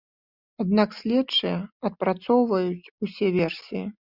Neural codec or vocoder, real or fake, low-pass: none; real; 5.4 kHz